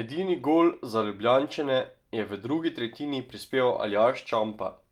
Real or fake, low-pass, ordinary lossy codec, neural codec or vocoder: real; 19.8 kHz; Opus, 32 kbps; none